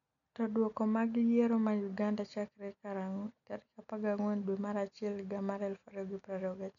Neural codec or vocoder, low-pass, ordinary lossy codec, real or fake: none; 7.2 kHz; none; real